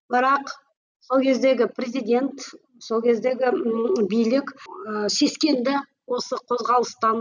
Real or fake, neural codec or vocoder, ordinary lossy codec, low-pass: real; none; none; 7.2 kHz